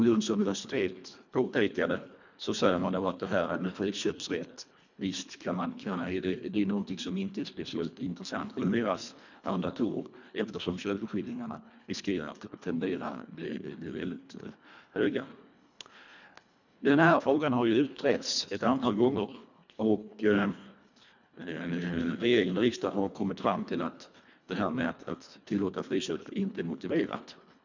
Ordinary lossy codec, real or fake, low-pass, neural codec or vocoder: none; fake; 7.2 kHz; codec, 24 kHz, 1.5 kbps, HILCodec